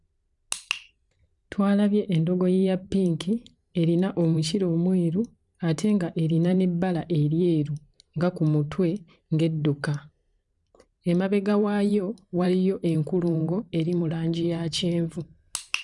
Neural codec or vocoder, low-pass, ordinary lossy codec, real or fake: vocoder, 44.1 kHz, 128 mel bands every 512 samples, BigVGAN v2; 10.8 kHz; none; fake